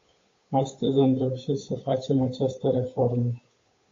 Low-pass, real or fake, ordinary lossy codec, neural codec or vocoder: 7.2 kHz; fake; AAC, 32 kbps; codec, 16 kHz, 8 kbps, FunCodec, trained on Chinese and English, 25 frames a second